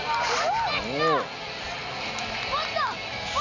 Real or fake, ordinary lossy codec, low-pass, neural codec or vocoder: real; none; 7.2 kHz; none